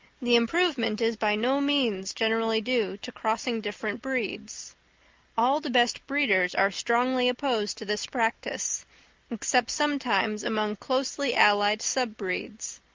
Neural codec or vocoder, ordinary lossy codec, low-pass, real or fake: none; Opus, 32 kbps; 7.2 kHz; real